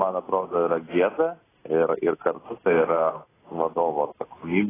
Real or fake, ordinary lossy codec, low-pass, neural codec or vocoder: real; AAC, 16 kbps; 3.6 kHz; none